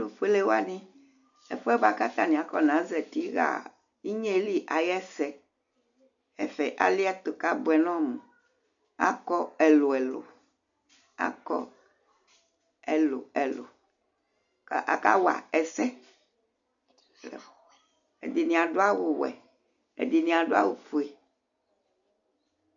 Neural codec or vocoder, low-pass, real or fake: none; 7.2 kHz; real